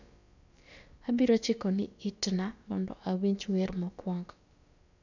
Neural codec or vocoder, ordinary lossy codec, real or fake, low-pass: codec, 16 kHz, about 1 kbps, DyCAST, with the encoder's durations; none; fake; 7.2 kHz